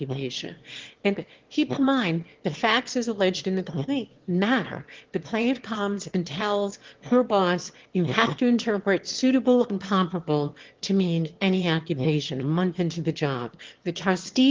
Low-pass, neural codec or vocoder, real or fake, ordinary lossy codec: 7.2 kHz; autoencoder, 22.05 kHz, a latent of 192 numbers a frame, VITS, trained on one speaker; fake; Opus, 16 kbps